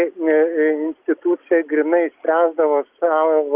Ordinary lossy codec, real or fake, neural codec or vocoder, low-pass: Opus, 32 kbps; real; none; 3.6 kHz